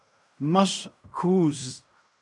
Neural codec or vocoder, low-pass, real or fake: codec, 16 kHz in and 24 kHz out, 0.4 kbps, LongCat-Audio-Codec, fine tuned four codebook decoder; 10.8 kHz; fake